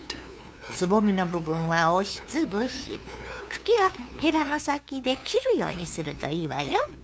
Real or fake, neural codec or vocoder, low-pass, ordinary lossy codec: fake; codec, 16 kHz, 2 kbps, FunCodec, trained on LibriTTS, 25 frames a second; none; none